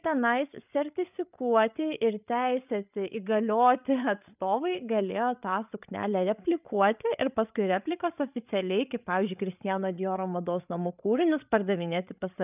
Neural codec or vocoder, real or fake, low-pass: codec, 16 kHz, 8 kbps, FreqCodec, larger model; fake; 3.6 kHz